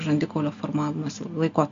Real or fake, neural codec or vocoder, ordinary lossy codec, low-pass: real; none; MP3, 48 kbps; 7.2 kHz